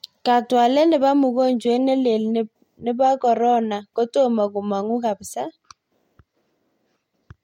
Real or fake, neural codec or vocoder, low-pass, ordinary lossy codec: real; none; 19.8 kHz; MP3, 64 kbps